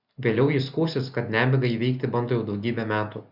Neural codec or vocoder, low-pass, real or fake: none; 5.4 kHz; real